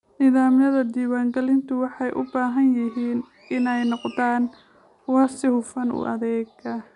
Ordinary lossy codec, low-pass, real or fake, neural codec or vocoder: none; 10.8 kHz; real; none